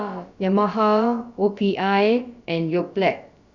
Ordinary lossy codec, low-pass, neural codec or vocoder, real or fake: Opus, 64 kbps; 7.2 kHz; codec, 16 kHz, about 1 kbps, DyCAST, with the encoder's durations; fake